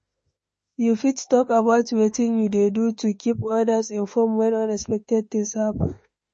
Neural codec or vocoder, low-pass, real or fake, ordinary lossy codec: autoencoder, 48 kHz, 32 numbers a frame, DAC-VAE, trained on Japanese speech; 10.8 kHz; fake; MP3, 32 kbps